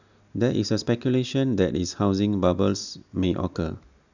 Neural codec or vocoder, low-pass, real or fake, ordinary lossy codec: none; 7.2 kHz; real; none